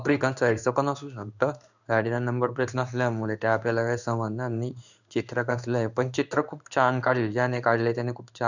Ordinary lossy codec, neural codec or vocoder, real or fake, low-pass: none; codec, 16 kHz in and 24 kHz out, 1 kbps, XY-Tokenizer; fake; 7.2 kHz